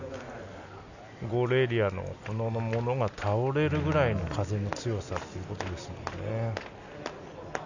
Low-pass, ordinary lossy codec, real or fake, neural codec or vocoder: 7.2 kHz; none; real; none